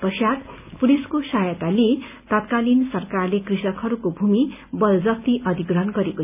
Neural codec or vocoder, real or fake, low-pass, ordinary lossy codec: none; real; 3.6 kHz; none